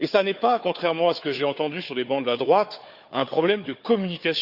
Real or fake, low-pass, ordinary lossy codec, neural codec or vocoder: fake; 5.4 kHz; Opus, 64 kbps; codec, 16 kHz, 4 kbps, FunCodec, trained on Chinese and English, 50 frames a second